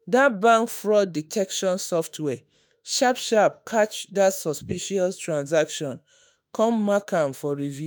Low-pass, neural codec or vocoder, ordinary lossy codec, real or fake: none; autoencoder, 48 kHz, 32 numbers a frame, DAC-VAE, trained on Japanese speech; none; fake